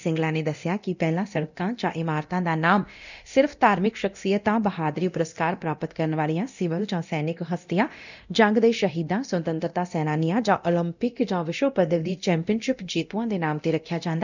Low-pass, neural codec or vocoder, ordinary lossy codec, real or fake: 7.2 kHz; codec, 24 kHz, 0.9 kbps, DualCodec; none; fake